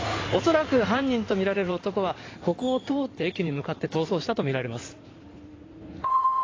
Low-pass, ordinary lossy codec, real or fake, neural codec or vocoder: 7.2 kHz; AAC, 32 kbps; fake; codec, 16 kHz in and 24 kHz out, 2.2 kbps, FireRedTTS-2 codec